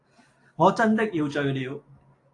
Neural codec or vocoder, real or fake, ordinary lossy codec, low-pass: none; real; AAC, 48 kbps; 10.8 kHz